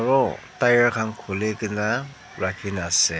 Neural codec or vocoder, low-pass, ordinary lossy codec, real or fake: none; none; none; real